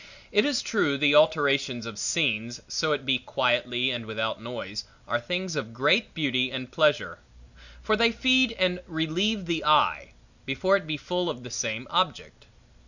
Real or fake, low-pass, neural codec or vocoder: real; 7.2 kHz; none